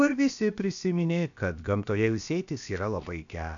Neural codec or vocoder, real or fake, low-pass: codec, 16 kHz, about 1 kbps, DyCAST, with the encoder's durations; fake; 7.2 kHz